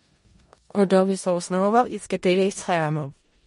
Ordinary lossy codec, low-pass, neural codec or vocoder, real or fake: MP3, 48 kbps; 10.8 kHz; codec, 16 kHz in and 24 kHz out, 0.4 kbps, LongCat-Audio-Codec, four codebook decoder; fake